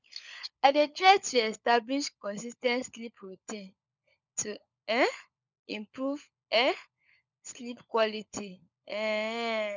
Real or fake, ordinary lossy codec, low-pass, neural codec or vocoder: fake; none; 7.2 kHz; codec, 16 kHz, 16 kbps, FunCodec, trained on LibriTTS, 50 frames a second